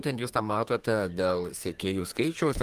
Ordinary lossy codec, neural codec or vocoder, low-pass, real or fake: Opus, 32 kbps; codec, 32 kHz, 1.9 kbps, SNAC; 14.4 kHz; fake